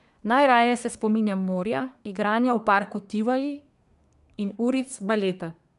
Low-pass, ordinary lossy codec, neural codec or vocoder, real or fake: 10.8 kHz; none; codec, 24 kHz, 1 kbps, SNAC; fake